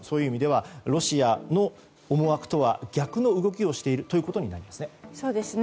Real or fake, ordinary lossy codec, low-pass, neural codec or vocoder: real; none; none; none